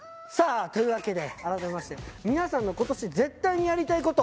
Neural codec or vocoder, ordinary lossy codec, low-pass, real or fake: none; none; none; real